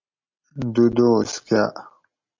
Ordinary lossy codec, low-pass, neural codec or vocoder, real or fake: MP3, 48 kbps; 7.2 kHz; none; real